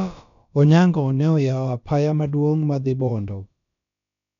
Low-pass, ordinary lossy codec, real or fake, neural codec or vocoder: 7.2 kHz; none; fake; codec, 16 kHz, about 1 kbps, DyCAST, with the encoder's durations